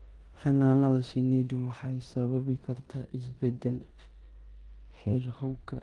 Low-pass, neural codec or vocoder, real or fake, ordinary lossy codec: 10.8 kHz; codec, 16 kHz in and 24 kHz out, 0.9 kbps, LongCat-Audio-Codec, four codebook decoder; fake; Opus, 24 kbps